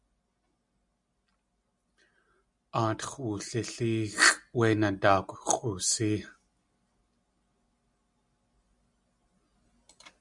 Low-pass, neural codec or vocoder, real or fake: 10.8 kHz; none; real